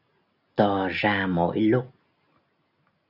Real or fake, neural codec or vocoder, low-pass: real; none; 5.4 kHz